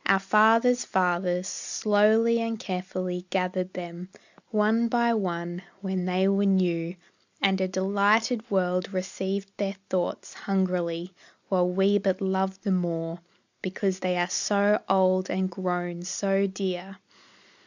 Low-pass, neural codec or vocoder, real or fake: 7.2 kHz; none; real